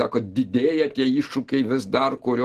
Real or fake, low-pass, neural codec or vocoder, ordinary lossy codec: real; 14.4 kHz; none; Opus, 16 kbps